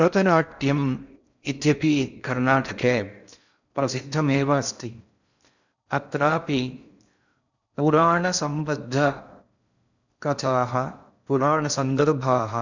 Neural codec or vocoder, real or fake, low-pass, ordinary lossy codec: codec, 16 kHz in and 24 kHz out, 0.6 kbps, FocalCodec, streaming, 4096 codes; fake; 7.2 kHz; none